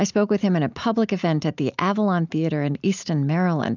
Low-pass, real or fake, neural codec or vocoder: 7.2 kHz; real; none